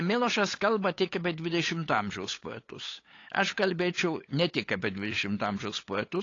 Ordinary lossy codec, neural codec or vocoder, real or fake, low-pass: AAC, 32 kbps; codec, 16 kHz, 8 kbps, FunCodec, trained on LibriTTS, 25 frames a second; fake; 7.2 kHz